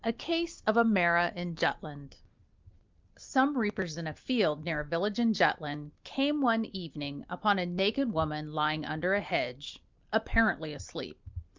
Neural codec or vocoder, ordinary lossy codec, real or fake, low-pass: none; Opus, 24 kbps; real; 7.2 kHz